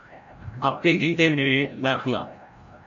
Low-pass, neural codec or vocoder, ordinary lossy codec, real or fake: 7.2 kHz; codec, 16 kHz, 0.5 kbps, FreqCodec, larger model; MP3, 48 kbps; fake